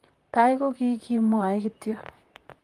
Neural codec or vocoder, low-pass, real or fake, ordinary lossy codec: vocoder, 44.1 kHz, 128 mel bands, Pupu-Vocoder; 14.4 kHz; fake; Opus, 32 kbps